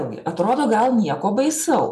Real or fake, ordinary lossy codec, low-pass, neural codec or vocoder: real; MP3, 96 kbps; 14.4 kHz; none